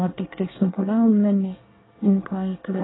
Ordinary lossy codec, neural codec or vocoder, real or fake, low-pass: AAC, 16 kbps; codec, 24 kHz, 1 kbps, SNAC; fake; 7.2 kHz